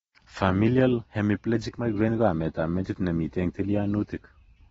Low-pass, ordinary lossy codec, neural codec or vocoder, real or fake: 19.8 kHz; AAC, 24 kbps; none; real